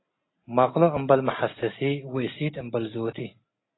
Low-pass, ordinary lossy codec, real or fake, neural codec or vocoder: 7.2 kHz; AAC, 16 kbps; real; none